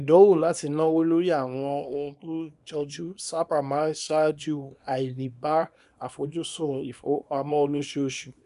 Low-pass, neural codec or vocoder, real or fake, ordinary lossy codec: 10.8 kHz; codec, 24 kHz, 0.9 kbps, WavTokenizer, small release; fake; none